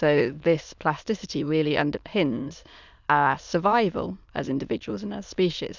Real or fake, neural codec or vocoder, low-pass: fake; autoencoder, 22.05 kHz, a latent of 192 numbers a frame, VITS, trained on many speakers; 7.2 kHz